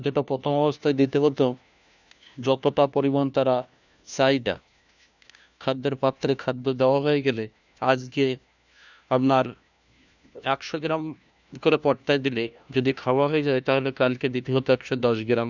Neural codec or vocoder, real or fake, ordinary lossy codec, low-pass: codec, 16 kHz, 1 kbps, FunCodec, trained on LibriTTS, 50 frames a second; fake; none; 7.2 kHz